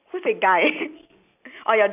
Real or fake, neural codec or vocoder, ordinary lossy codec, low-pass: real; none; none; 3.6 kHz